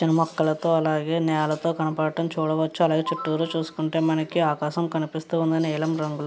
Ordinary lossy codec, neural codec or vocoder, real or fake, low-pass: none; none; real; none